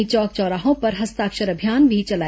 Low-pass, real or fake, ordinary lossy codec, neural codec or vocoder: none; real; none; none